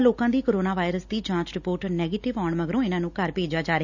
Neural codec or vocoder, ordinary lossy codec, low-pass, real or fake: none; none; 7.2 kHz; real